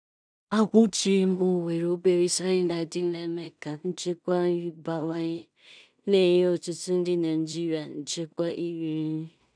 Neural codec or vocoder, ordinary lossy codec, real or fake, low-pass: codec, 16 kHz in and 24 kHz out, 0.4 kbps, LongCat-Audio-Codec, two codebook decoder; none; fake; 9.9 kHz